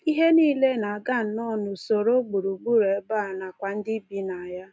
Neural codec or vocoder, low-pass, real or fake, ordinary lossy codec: none; none; real; none